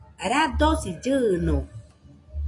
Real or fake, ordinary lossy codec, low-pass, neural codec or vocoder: real; AAC, 48 kbps; 10.8 kHz; none